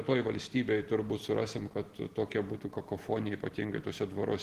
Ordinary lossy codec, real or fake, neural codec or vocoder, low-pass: Opus, 16 kbps; real; none; 14.4 kHz